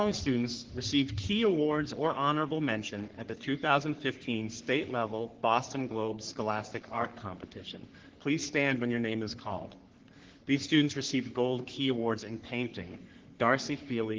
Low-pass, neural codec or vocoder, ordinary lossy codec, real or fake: 7.2 kHz; codec, 44.1 kHz, 3.4 kbps, Pupu-Codec; Opus, 16 kbps; fake